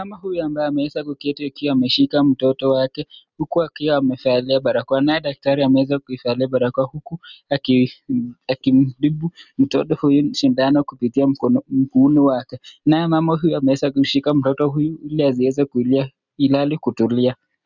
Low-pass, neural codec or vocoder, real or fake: 7.2 kHz; none; real